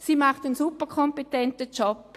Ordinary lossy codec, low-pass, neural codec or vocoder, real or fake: AAC, 64 kbps; 14.4 kHz; none; real